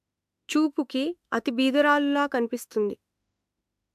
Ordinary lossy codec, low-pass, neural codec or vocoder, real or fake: AAC, 96 kbps; 14.4 kHz; autoencoder, 48 kHz, 32 numbers a frame, DAC-VAE, trained on Japanese speech; fake